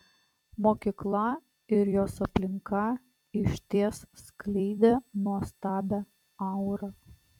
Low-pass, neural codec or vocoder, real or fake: 19.8 kHz; vocoder, 44.1 kHz, 128 mel bands every 256 samples, BigVGAN v2; fake